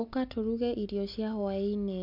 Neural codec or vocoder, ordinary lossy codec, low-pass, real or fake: none; MP3, 48 kbps; 5.4 kHz; real